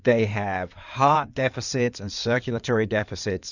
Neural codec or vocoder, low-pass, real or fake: codec, 16 kHz in and 24 kHz out, 2.2 kbps, FireRedTTS-2 codec; 7.2 kHz; fake